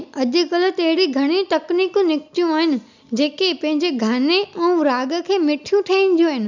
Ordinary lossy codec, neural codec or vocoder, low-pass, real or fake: none; none; 7.2 kHz; real